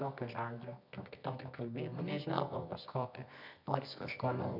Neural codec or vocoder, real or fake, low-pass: codec, 24 kHz, 0.9 kbps, WavTokenizer, medium music audio release; fake; 5.4 kHz